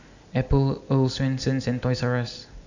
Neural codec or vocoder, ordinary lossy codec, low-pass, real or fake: none; none; 7.2 kHz; real